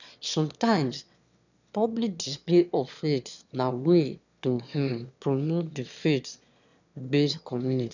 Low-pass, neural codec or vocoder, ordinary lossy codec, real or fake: 7.2 kHz; autoencoder, 22.05 kHz, a latent of 192 numbers a frame, VITS, trained on one speaker; none; fake